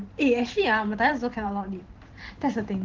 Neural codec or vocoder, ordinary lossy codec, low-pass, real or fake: vocoder, 44.1 kHz, 128 mel bands every 512 samples, BigVGAN v2; Opus, 16 kbps; 7.2 kHz; fake